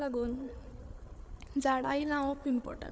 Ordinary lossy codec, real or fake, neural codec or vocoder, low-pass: none; fake; codec, 16 kHz, 8 kbps, FreqCodec, larger model; none